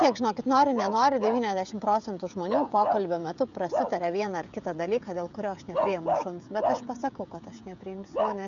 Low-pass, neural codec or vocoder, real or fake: 7.2 kHz; codec, 16 kHz, 16 kbps, FreqCodec, smaller model; fake